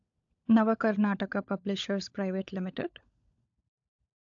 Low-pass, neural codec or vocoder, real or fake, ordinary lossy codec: 7.2 kHz; codec, 16 kHz, 16 kbps, FunCodec, trained on LibriTTS, 50 frames a second; fake; AAC, 64 kbps